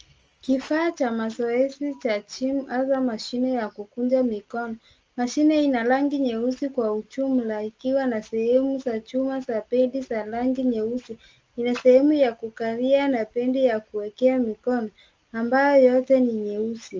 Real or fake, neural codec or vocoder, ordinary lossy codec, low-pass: real; none; Opus, 24 kbps; 7.2 kHz